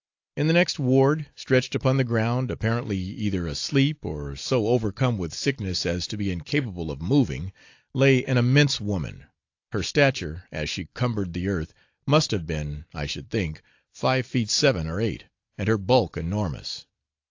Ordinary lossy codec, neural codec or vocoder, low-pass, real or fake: AAC, 48 kbps; none; 7.2 kHz; real